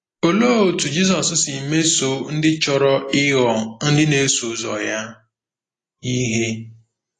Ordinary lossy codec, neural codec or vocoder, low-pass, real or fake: AAC, 32 kbps; none; 10.8 kHz; real